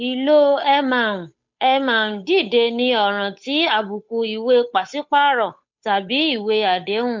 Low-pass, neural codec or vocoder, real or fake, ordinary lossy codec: 7.2 kHz; codec, 16 kHz, 8 kbps, FunCodec, trained on Chinese and English, 25 frames a second; fake; MP3, 48 kbps